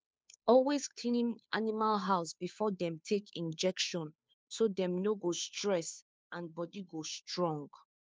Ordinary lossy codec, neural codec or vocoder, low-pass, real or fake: none; codec, 16 kHz, 2 kbps, FunCodec, trained on Chinese and English, 25 frames a second; none; fake